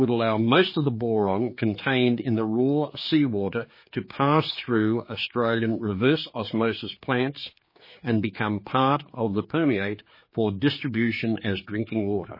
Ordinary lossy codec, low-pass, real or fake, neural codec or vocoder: MP3, 24 kbps; 5.4 kHz; fake; codec, 16 kHz, 4 kbps, X-Codec, HuBERT features, trained on general audio